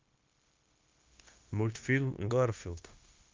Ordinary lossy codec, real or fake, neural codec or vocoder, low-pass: Opus, 24 kbps; fake; codec, 16 kHz, 0.9 kbps, LongCat-Audio-Codec; 7.2 kHz